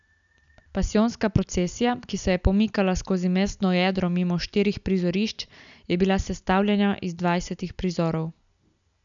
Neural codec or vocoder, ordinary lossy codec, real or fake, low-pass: none; none; real; 7.2 kHz